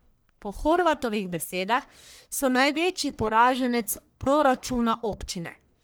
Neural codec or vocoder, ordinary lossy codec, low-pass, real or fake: codec, 44.1 kHz, 1.7 kbps, Pupu-Codec; none; none; fake